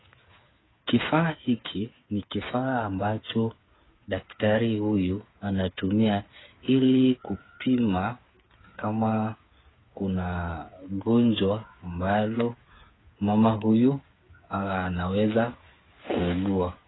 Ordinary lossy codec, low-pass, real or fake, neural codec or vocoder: AAC, 16 kbps; 7.2 kHz; fake; codec, 16 kHz, 8 kbps, FreqCodec, smaller model